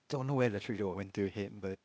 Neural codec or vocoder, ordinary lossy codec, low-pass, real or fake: codec, 16 kHz, 0.8 kbps, ZipCodec; none; none; fake